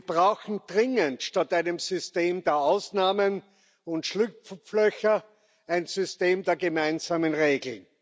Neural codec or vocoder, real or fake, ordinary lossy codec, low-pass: none; real; none; none